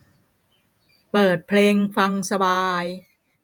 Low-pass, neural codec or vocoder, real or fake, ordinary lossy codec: none; vocoder, 48 kHz, 128 mel bands, Vocos; fake; none